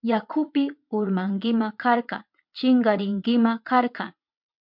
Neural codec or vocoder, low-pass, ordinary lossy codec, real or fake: vocoder, 22.05 kHz, 80 mel bands, WaveNeXt; 5.4 kHz; MP3, 48 kbps; fake